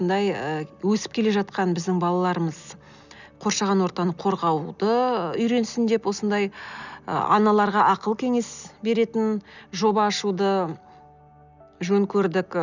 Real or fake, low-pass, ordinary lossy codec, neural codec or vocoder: real; 7.2 kHz; none; none